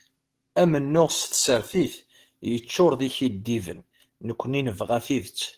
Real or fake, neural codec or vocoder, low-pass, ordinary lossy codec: fake; codec, 44.1 kHz, 7.8 kbps, DAC; 14.4 kHz; Opus, 16 kbps